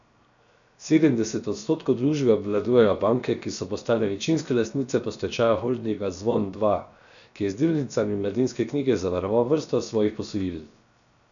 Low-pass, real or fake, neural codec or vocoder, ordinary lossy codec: 7.2 kHz; fake; codec, 16 kHz, 0.7 kbps, FocalCodec; none